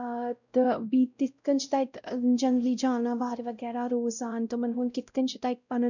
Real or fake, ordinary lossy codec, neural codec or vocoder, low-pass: fake; none; codec, 16 kHz, 0.5 kbps, X-Codec, WavLM features, trained on Multilingual LibriSpeech; 7.2 kHz